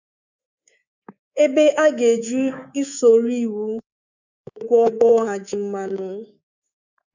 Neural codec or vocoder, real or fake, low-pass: codec, 24 kHz, 3.1 kbps, DualCodec; fake; 7.2 kHz